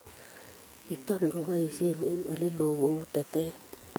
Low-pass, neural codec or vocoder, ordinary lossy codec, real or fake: none; codec, 44.1 kHz, 2.6 kbps, SNAC; none; fake